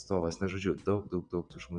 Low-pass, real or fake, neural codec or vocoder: 9.9 kHz; fake; vocoder, 22.05 kHz, 80 mel bands, WaveNeXt